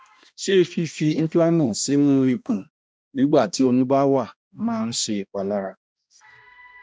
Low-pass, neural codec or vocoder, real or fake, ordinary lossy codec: none; codec, 16 kHz, 1 kbps, X-Codec, HuBERT features, trained on balanced general audio; fake; none